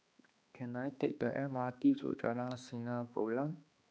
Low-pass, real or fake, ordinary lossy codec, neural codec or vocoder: none; fake; none; codec, 16 kHz, 2 kbps, X-Codec, HuBERT features, trained on balanced general audio